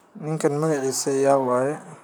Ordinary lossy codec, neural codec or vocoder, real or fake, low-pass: none; vocoder, 44.1 kHz, 128 mel bands, Pupu-Vocoder; fake; none